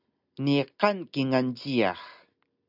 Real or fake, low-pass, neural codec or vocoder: real; 5.4 kHz; none